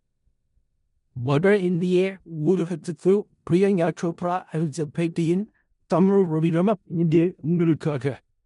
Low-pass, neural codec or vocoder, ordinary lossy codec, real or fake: 10.8 kHz; codec, 16 kHz in and 24 kHz out, 0.4 kbps, LongCat-Audio-Codec, four codebook decoder; MP3, 64 kbps; fake